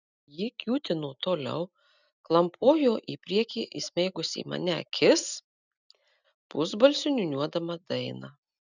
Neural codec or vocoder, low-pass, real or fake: none; 7.2 kHz; real